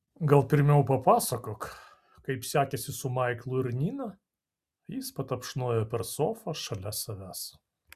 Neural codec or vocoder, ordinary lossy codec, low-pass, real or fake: none; Opus, 64 kbps; 14.4 kHz; real